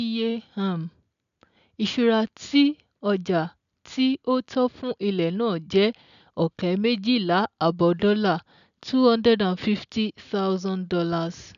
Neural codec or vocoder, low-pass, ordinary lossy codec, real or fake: none; 7.2 kHz; none; real